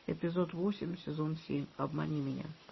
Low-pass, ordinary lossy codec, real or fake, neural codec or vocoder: 7.2 kHz; MP3, 24 kbps; real; none